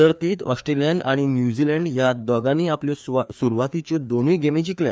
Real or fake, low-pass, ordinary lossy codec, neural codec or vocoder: fake; none; none; codec, 16 kHz, 2 kbps, FreqCodec, larger model